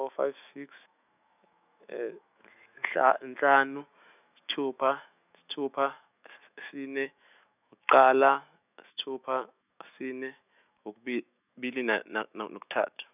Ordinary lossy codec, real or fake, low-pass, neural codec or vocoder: none; fake; 3.6 kHz; autoencoder, 48 kHz, 128 numbers a frame, DAC-VAE, trained on Japanese speech